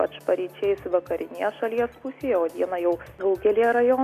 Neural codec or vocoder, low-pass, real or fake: none; 14.4 kHz; real